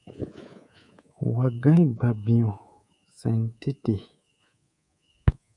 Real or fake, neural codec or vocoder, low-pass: fake; codec, 24 kHz, 3.1 kbps, DualCodec; 10.8 kHz